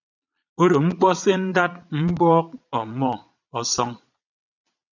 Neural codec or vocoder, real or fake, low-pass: vocoder, 22.05 kHz, 80 mel bands, Vocos; fake; 7.2 kHz